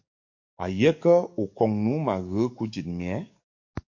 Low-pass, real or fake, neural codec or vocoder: 7.2 kHz; fake; codec, 44.1 kHz, 7.8 kbps, DAC